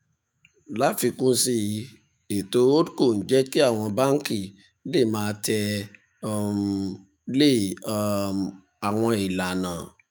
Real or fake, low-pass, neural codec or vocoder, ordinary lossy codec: fake; none; autoencoder, 48 kHz, 128 numbers a frame, DAC-VAE, trained on Japanese speech; none